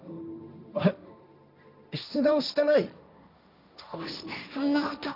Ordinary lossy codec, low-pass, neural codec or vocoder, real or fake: none; 5.4 kHz; codec, 16 kHz, 1.1 kbps, Voila-Tokenizer; fake